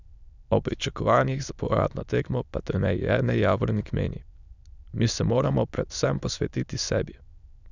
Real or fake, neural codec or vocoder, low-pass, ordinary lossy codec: fake; autoencoder, 22.05 kHz, a latent of 192 numbers a frame, VITS, trained on many speakers; 7.2 kHz; none